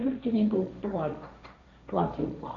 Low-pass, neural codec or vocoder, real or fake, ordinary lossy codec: 7.2 kHz; codec, 16 kHz, 1.1 kbps, Voila-Tokenizer; fake; none